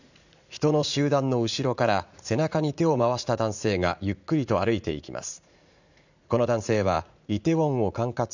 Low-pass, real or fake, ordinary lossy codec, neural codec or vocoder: 7.2 kHz; fake; none; vocoder, 44.1 kHz, 80 mel bands, Vocos